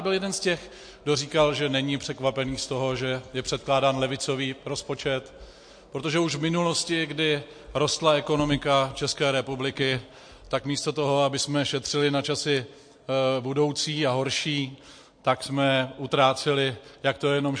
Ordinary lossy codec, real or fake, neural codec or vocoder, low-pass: MP3, 48 kbps; real; none; 9.9 kHz